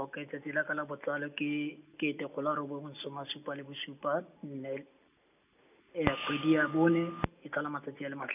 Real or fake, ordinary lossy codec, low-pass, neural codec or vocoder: real; none; 3.6 kHz; none